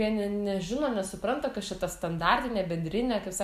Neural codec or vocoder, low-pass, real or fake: none; 14.4 kHz; real